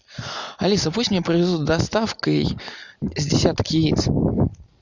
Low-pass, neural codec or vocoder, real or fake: 7.2 kHz; none; real